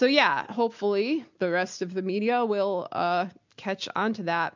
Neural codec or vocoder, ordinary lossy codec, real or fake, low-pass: none; MP3, 64 kbps; real; 7.2 kHz